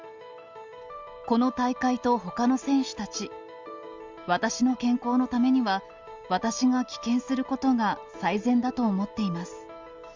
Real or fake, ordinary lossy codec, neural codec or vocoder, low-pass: real; Opus, 32 kbps; none; 7.2 kHz